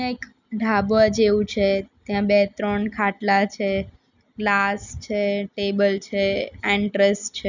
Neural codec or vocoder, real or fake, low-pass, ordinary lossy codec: none; real; 7.2 kHz; none